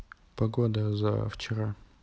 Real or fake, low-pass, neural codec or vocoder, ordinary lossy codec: real; none; none; none